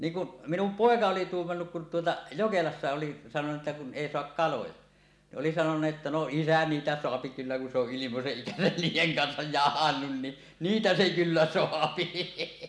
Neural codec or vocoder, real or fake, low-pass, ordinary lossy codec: none; real; none; none